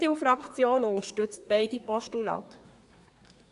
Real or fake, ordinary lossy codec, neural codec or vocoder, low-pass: fake; none; codec, 24 kHz, 1 kbps, SNAC; 10.8 kHz